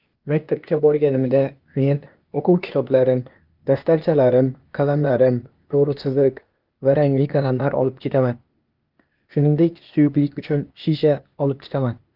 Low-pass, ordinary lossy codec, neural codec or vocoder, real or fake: 5.4 kHz; Opus, 24 kbps; codec, 16 kHz, 0.8 kbps, ZipCodec; fake